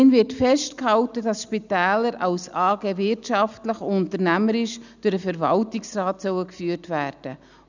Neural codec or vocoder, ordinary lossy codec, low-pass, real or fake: none; none; 7.2 kHz; real